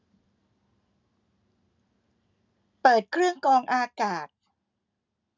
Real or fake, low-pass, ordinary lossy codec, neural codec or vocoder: real; 7.2 kHz; none; none